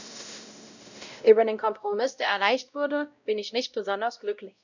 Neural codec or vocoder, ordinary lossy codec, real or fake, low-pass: codec, 16 kHz, 0.5 kbps, X-Codec, WavLM features, trained on Multilingual LibriSpeech; none; fake; 7.2 kHz